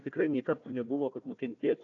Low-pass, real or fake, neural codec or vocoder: 7.2 kHz; fake; codec, 16 kHz, 1 kbps, FunCodec, trained on Chinese and English, 50 frames a second